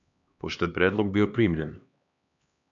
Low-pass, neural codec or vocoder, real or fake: 7.2 kHz; codec, 16 kHz, 2 kbps, X-Codec, HuBERT features, trained on LibriSpeech; fake